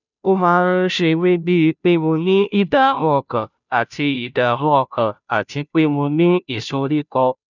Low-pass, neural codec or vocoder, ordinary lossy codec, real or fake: 7.2 kHz; codec, 16 kHz, 0.5 kbps, FunCodec, trained on Chinese and English, 25 frames a second; none; fake